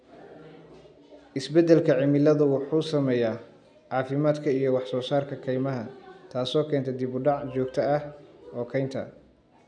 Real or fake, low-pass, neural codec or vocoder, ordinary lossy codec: real; 9.9 kHz; none; none